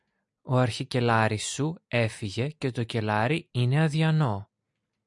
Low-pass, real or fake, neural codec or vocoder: 10.8 kHz; real; none